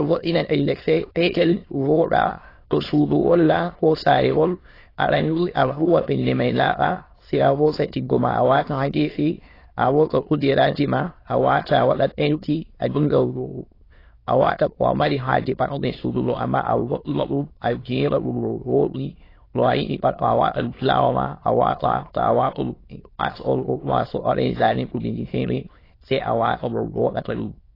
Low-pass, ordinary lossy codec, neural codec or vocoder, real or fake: 5.4 kHz; AAC, 24 kbps; autoencoder, 22.05 kHz, a latent of 192 numbers a frame, VITS, trained on many speakers; fake